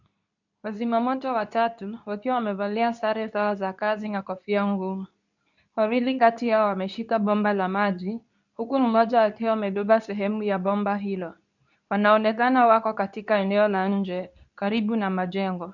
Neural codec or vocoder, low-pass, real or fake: codec, 24 kHz, 0.9 kbps, WavTokenizer, medium speech release version 2; 7.2 kHz; fake